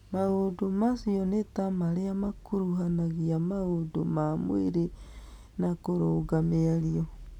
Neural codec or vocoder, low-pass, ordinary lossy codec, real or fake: none; 19.8 kHz; none; real